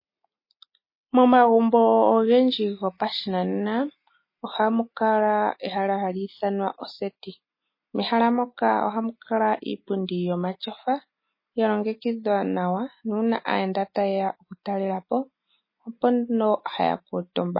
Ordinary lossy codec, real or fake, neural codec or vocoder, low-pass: MP3, 24 kbps; real; none; 5.4 kHz